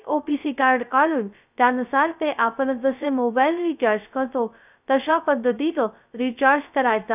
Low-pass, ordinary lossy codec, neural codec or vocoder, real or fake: 3.6 kHz; none; codec, 16 kHz, 0.2 kbps, FocalCodec; fake